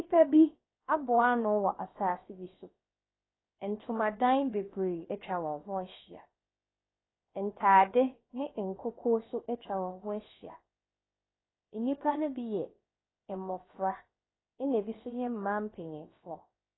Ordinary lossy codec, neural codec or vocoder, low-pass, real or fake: AAC, 16 kbps; codec, 16 kHz, 0.7 kbps, FocalCodec; 7.2 kHz; fake